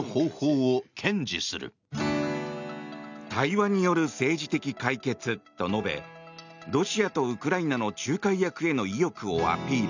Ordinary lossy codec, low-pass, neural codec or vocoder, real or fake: none; 7.2 kHz; none; real